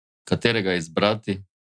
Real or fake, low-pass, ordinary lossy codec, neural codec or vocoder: real; 9.9 kHz; none; none